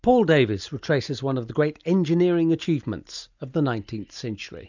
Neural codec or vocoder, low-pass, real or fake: none; 7.2 kHz; real